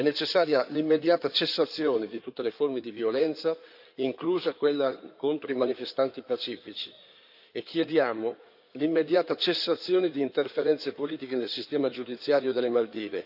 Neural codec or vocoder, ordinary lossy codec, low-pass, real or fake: codec, 16 kHz in and 24 kHz out, 2.2 kbps, FireRedTTS-2 codec; none; 5.4 kHz; fake